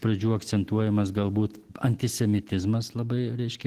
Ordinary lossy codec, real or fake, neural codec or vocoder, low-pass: Opus, 16 kbps; real; none; 14.4 kHz